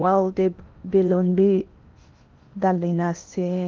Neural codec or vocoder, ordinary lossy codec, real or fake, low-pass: codec, 16 kHz in and 24 kHz out, 0.8 kbps, FocalCodec, streaming, 65536 codes; Opus, 32 kbps; fake; 7.2 kHz